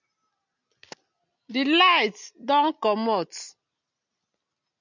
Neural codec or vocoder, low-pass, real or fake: none; 7.2 kHz; real